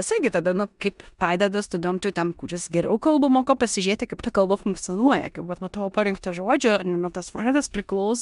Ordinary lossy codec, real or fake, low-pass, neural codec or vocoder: MP3, 96 kbps; fake; 10.8 kHz; codec, 16 kHz in and 24 kHz out, 0.9 kbps, LongCat-Audio-Codec, four codebook decoder